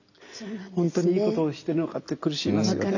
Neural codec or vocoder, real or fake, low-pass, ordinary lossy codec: none; real; 7.2 kHz; none